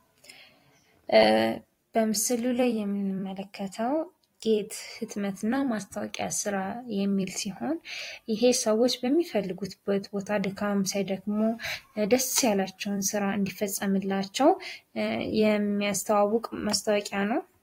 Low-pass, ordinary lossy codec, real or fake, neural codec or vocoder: 19.8 kHz; AAC, 48 kbps; fake; vocoder, 44.1 kHz, 128 mel bands every 256 samples, BigVGAN v2